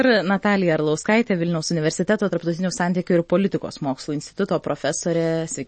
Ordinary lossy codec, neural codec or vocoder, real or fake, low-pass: MP3, 32 kbps; none; real; 9.9 kHz